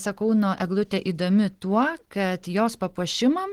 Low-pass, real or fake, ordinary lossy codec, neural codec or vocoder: 19.8 kHz; real; Opus, 16 kbps; none